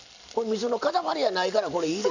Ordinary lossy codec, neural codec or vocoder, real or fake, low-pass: none; none; real; 7.2 kHz